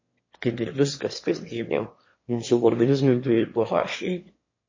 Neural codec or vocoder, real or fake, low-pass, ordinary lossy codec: autoencoder, 22.05 kHz, a latent of 192 numbers a frame, VITS, trained on one speaker; fake; 7.2 kHz; MP3, 32 kbps